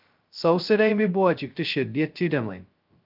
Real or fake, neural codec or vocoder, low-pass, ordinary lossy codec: fake; codec, 16 kHz, 0.2 kbps, FocalCodec; 5.4 kHz; Opus, 24 kbps